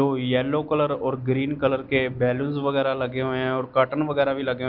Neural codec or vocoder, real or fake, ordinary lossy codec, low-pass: none; real; Opus, 32 kbps; 5.4 kHz